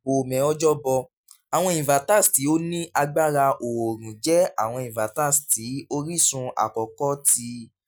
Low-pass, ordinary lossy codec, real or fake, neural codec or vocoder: none; none; real; none